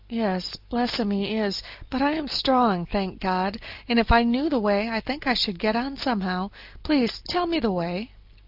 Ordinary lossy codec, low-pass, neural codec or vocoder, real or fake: Opus, 24 kbps; 5.4 kHz; none; real